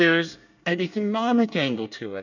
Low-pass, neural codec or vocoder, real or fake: 7.2 kHz; codec, 24 kHz, 1 kbps, SNAC; fake